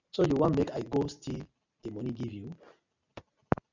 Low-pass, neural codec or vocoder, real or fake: 7.2 kHz; none; real